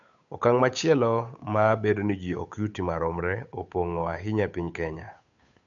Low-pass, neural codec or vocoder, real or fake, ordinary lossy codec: 7.2 kHz; codec, 16 kHz, 8 kbps, FunCodec, trained on Chinese and English, 25 frames a second; fake; none